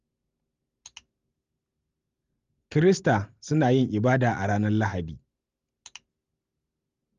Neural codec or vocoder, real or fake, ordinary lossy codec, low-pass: none; real; Opus, 32 kbps; 7.2 kHz